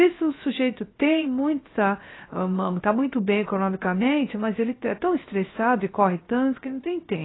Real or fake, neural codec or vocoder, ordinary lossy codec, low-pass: fake; codec, 16 kHz, 0.3 kbps, FocalCodec; AAC, 16 kbps; 7.2 kHz